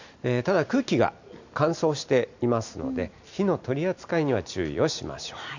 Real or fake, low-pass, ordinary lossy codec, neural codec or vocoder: real; 7.2 kHz; none; none